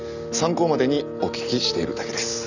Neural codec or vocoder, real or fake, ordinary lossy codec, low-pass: none; real; none; 7.2 kHz